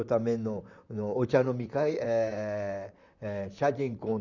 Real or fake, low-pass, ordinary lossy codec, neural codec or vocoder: fake; 7.2 kHz; none; vocoder, 44.1 kHz, 128 mel bands, Pupu-Vocoder